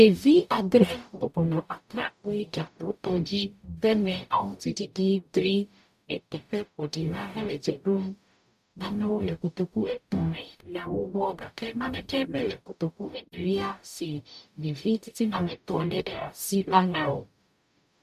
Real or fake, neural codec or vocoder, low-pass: fake; codec, 44.1 kHz, 0.9 kbps, DAC; 14.4 kHz